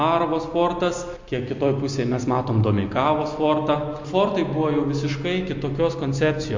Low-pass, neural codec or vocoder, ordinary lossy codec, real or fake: 7.2 kHz; none; MP3, 48 kbps; real